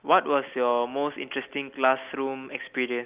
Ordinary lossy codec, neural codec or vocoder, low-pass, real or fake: Opus, 32 kbps; none; 3.6 kHz; real